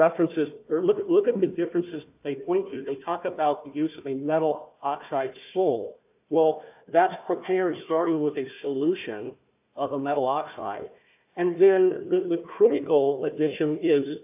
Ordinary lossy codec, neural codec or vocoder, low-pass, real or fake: AAC, 32 kbps; codec, 16 kHz, 1 kbps, FunCodec, trained on Chinese and English, 50 frames a second; 3.6 kHz; fake